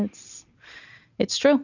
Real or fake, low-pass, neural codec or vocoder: real; 7.2 kHz; none